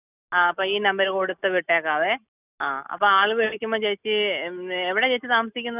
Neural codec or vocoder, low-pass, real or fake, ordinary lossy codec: none; 3.6 kHz; real; none